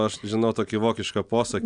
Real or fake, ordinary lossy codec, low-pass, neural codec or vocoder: real; Opus, 64 kbps; 9.9 kHz; none